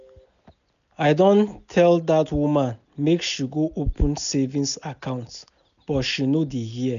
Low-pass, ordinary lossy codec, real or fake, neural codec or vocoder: 7.2 kHz; none; real; none